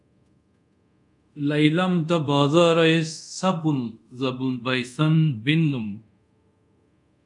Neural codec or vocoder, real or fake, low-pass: codec, 24 kHz, 0.5 kbps, DualCodec; fake; 10.8 kHz